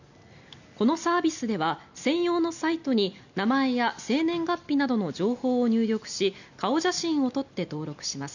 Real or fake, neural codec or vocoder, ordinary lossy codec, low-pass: real; none; none; 7.2 kHz